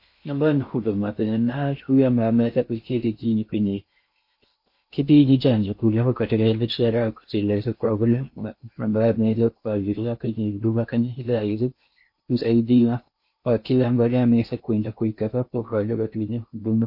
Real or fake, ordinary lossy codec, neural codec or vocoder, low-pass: fake; MP3, 32 kbps; codec, 16 kHz in and 24 kHz out, 0.6 kbps, FocalCodec, streaming, 4096 codes; 5.4 kHz